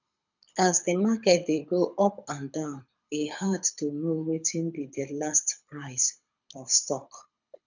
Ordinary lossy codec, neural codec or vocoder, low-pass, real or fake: none; codec, 24 kHz, 6 kbps, HILCodec; 7.2 kHz; fake